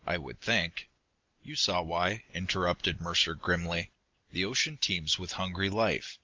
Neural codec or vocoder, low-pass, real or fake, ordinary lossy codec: none; 7.2 kHz; real; Opus, 32 kbps